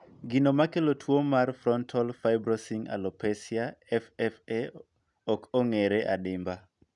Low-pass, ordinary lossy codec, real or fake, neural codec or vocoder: 10.8 kHz; none; real; none